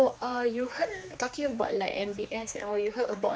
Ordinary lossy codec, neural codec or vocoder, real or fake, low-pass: none; codec, 16 kHz, 2 kbps, X-Codec, HuBERT features, trained on general audio; fake; none